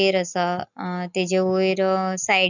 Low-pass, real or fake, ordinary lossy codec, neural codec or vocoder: 7.2 kHz; real; none; none